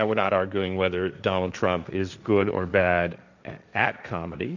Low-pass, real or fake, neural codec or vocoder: 7.2 kHz; fake; codec, 16 kHz, 1.1 kbps, Voila-Tokenizer